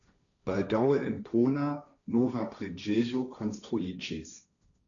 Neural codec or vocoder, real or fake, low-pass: codec, 16 kHz, 1.1 kbps, Voila-Tokenizer; fake; 7.2 kHz